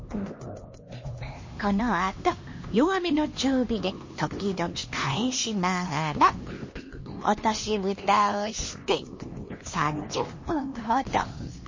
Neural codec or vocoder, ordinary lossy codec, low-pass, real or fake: codec, 16 kHz, 2 kbps, X-Codec, HuBERT features, trained on LibriSpeech; MP3, 32 kbps; 7.2 kHz; fake